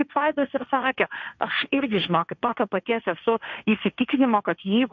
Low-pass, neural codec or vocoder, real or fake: 7.2 kHz; codec, 16 kHz, 1.1 kbps, Voila-Tokenizer; fake